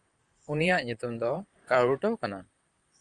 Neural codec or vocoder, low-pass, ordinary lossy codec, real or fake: vocoder, 44.1 kHz, 128 mel bands, Pupu-Vocoder; 10.8 kHz; Opus, 32 kbps; fake